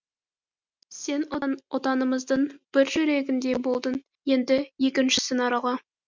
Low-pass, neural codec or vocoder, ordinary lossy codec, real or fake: 7.2 kHz; none; MP3, 64 kbps; real